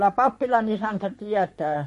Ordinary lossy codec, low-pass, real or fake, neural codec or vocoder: MP3, 48 kbps; 14.4 kHz; fake; codec, 44.1 kHz, 3.4 kbps, Pupu-Codec